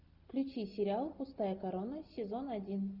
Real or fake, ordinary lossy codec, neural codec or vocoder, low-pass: real; Opus, 64 kbps; none; 5.4 kHz